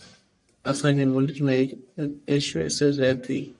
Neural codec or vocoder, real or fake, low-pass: codec, 44.1 kHz, 1.7 kbps, Pupu-Codec; fake; 10.8 kHz